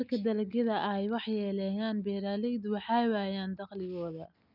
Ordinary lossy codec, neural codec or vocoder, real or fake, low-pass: none; none; real; 5.4 kHz